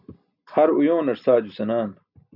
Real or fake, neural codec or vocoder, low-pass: real; none; 5.4 kHz